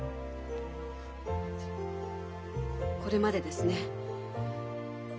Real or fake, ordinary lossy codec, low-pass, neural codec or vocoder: real; none; none; none